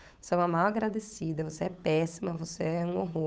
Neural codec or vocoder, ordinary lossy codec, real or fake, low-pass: codec, 16 kHz, 8 kbps, FunCodec, trained on Chinese and English, 25 frames a second; none; fake; none